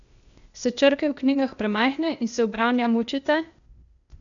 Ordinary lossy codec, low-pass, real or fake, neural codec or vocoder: none; 7.2 kHz; fake; codec, 16 kHz, 0.8 kbps, ZipCodec